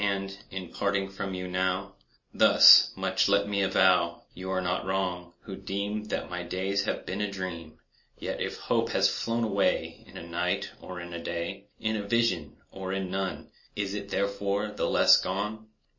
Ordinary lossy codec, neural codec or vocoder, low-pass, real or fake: MP3, 32 kbps; none; 7.2 kHz; real